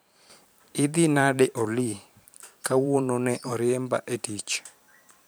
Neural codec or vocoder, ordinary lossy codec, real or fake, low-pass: vocoder, 44.1 kHz, 128 mel bands every 512 samples, BigVGAN v2; none; fake; none